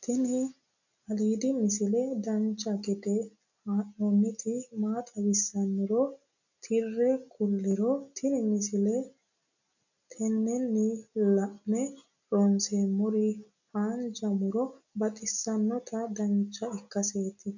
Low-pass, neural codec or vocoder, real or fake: 7.2 kHz; none; real